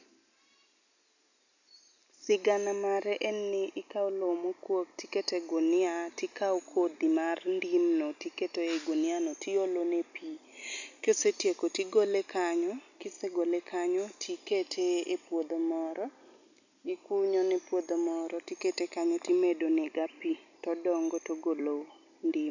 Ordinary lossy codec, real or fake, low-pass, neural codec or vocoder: none; real; 7.2 kHz; none